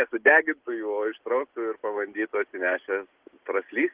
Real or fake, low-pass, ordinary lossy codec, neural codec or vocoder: real; 3.6 kHz; Opus, 16 kbps; none